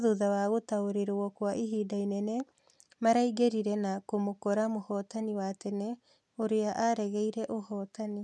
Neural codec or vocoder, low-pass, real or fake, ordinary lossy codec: none; none; real; none